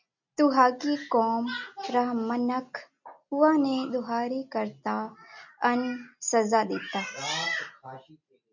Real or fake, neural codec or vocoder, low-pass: real; none; 7.2 kHz